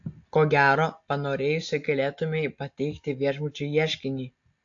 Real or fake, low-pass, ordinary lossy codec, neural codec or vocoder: real; 7.2 kHz; AAC, 48 kbps; none